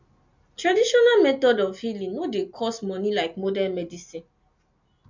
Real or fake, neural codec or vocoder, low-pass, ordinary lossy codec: real; none; 7.2 kHz; MP3, 64 kbps